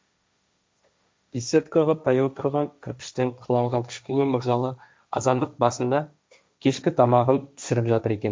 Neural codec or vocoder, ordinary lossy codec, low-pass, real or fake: codec, 16 kHz, 1.1 kbps, Voila-Tokenizer; none; none; fake